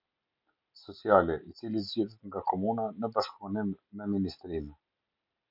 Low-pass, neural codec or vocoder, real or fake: 5.4 kHz; none; real